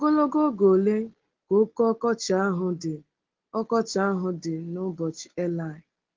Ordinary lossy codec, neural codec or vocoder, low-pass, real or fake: Opus, 16 kbps; none; 7.2 kHz; real